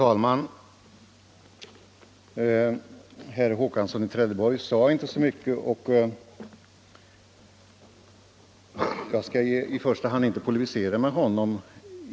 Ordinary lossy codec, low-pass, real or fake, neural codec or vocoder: none; none; real; none